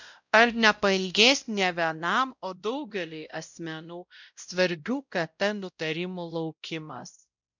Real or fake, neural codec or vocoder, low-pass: fake; codec, 16 kHz, 0.5 kbps, X-Codec, WavLM features, trained on Multilingual LibriSpeech; 7.2 kHz